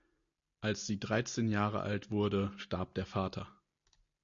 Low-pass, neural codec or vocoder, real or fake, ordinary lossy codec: 7.2 kHz; none; real; MP3, 48 kbps